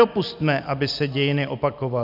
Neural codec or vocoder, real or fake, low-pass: none; real; 5.4 kHz